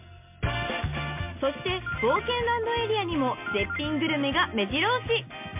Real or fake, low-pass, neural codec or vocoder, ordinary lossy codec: real; 3.6 kHz; none; MP3, 32 kbps